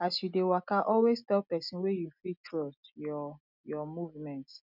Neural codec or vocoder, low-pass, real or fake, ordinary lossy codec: none; 5.4 kHz; real; none